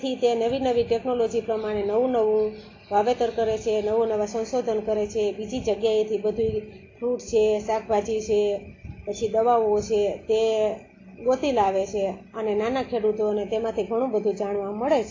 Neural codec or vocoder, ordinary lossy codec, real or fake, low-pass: none; AAC, 32 kbps; real; 7.2 kHz